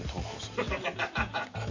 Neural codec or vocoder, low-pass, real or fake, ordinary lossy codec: none; 7.2 kHz; real; MP3, 64 kbps